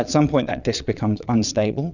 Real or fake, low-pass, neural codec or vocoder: fake; 7.2 kHz; vocoder, 22.05 kHz, 80 mel bands, Vocos